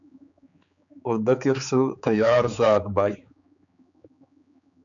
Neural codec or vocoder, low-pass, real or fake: codec, 16 kHz, 4 kbps, X-Codec, HuBERT features, trained on general audio; 7.2 kHz; fake